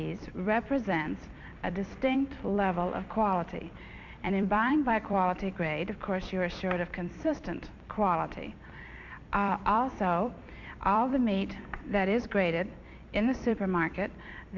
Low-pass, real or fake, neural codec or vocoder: 7.2 kHz; fake; vocoder, 44.1 kHz, 128 mel bands every 256 samples, BigVGAN v2